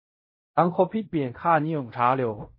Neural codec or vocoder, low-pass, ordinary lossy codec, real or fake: codec, 16 kHz in and 24 kHz out, 0.4 kbps, LongCat-Audio-Codec, fine tuned four codebook decoder; 5.4 kHz; MP3, 24 kbps; fake